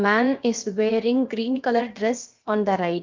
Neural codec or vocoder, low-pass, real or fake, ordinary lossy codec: codec, 16 kHz, about 1 kbps, DyCAST, with the encoder's durations; 7.2 kHz; fake; Opus, 24 kbps